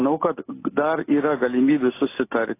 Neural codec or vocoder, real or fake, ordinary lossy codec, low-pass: none; real; AAC, 24 kbps; 3.6 kHz